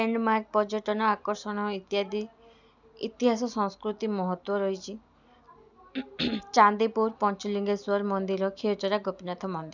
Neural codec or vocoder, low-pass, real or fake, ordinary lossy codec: none; 7.2 kHz; real; none